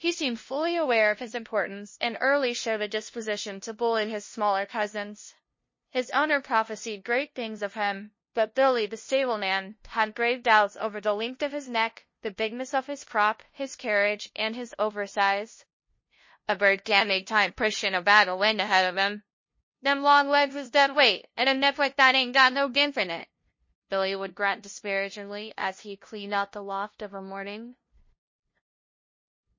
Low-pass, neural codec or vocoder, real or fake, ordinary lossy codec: 7.2 kHz; codec, 16 kHz, 0.5 kbps, FunCodec, trained on LibriTTS, 25 frames a second; fake; MP3, 32 kbps